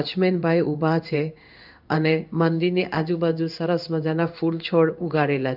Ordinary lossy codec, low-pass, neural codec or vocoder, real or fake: none; 5.4 kHz; codec, 16 kHz in and 24 kHz out, 1 kbps, XY-Tokenizer; fake